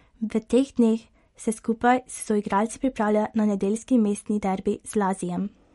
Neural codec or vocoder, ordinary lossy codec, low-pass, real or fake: none; MP3, 48 kbps; 19.8 kHz; real